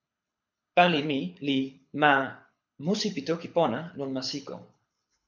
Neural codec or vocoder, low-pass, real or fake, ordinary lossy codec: codec, 24 kHz, 6 kbps, HILCodec; 7.2 kHz; fake; MP3, 64 kbps